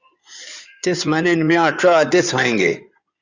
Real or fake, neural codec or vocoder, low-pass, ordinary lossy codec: fake; codec, 16 kHz in and 24 kHz out, 2.2 kbps, FireRedTTS-2 codec; 7.2 kHz; Opus, 64 kbps